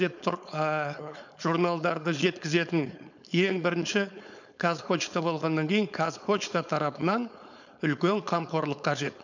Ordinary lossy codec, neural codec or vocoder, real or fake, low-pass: none; codec, 16 kHz, 4.8 kbps, FACodec; fake; 7.2 kHz